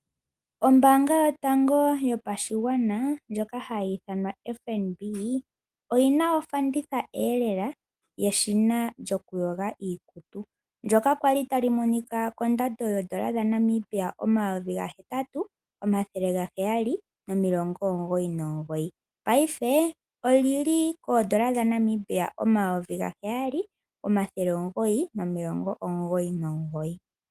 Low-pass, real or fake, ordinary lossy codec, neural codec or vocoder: 14.4 kHz; real; Opus, 32 kbps; none